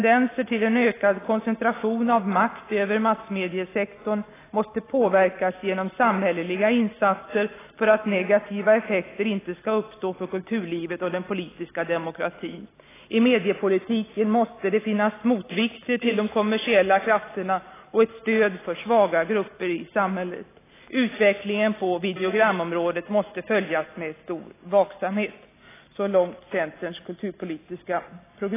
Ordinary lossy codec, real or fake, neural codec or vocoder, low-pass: AAC, 16 kbps; real; none; 3.6 kHz